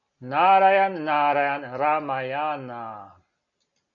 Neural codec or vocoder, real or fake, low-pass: none; real; 7.2 kHz